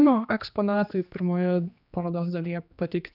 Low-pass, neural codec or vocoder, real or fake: 5.4 kHz; codec, 16 kHz, 2 kbps, X-Codec, HuBERT features, trained on general audio; fake